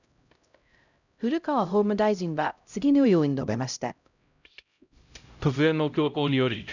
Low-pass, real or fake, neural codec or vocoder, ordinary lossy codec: 7.2 kHz; fake; codec, 16 kHz, 0.5 kbps, X-Codec, HuBERT features, trained on LibriSpeech; none